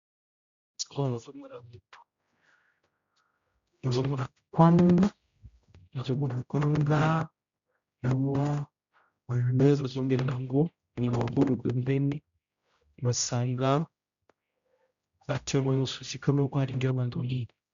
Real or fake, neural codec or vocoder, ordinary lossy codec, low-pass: fake; codec, 16 kHz, 0.5 kbps, X-Codec, HuBERT features, trained on general audio; Opus, 64 kbps; 7.2 kHz